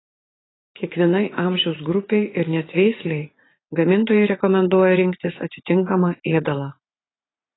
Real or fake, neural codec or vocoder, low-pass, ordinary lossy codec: fake; vocoder, 44.1 kHz, 80 mel bands, Vocos; 7.2 kHz; AAC, 16 kbps